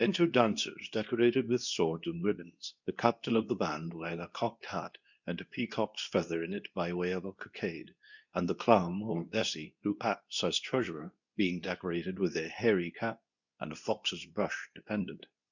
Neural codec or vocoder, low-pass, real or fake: codec, 24 kHz, 0.9 kbps, WavTokenizer, medium speech release version 2; 7.2 kHz; fake